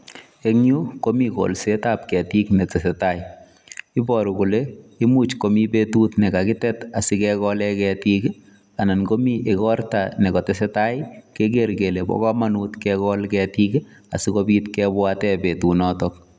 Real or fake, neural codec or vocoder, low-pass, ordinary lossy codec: real; none; none; none